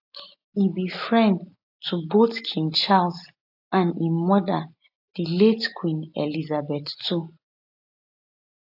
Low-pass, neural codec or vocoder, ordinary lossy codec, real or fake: 5.4 kHz; none; AAC, 48 kbps; real